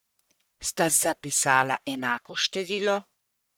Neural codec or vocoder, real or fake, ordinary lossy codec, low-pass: codec, 44.1 kHz, 1.7 kbps, Pupu-Codec; fake; none; none